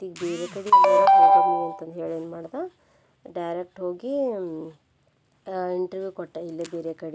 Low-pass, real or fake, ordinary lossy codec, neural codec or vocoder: none; real; none; none